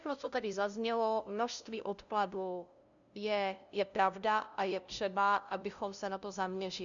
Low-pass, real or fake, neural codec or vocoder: 7.2 kHz; fake; codec, 16 kHz, 0.5 kbps, FunCodec, trained on LibriTTS, 25 frames a second